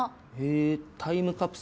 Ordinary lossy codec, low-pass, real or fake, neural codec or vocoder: none; none; real; none